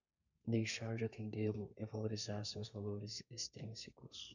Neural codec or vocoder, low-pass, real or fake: codec, 32 kHz, 1.9 kbps, SNAC; 7.2 kHz; fake